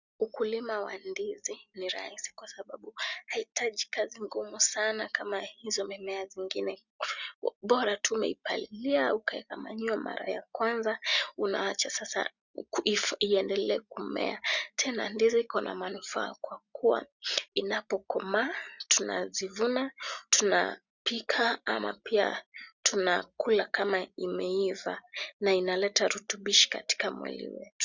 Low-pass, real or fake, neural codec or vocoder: 7.2 kHz; real; none